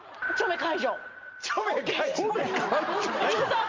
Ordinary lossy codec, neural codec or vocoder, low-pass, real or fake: Opus, 32 kbps; none; 7.2 kHz; real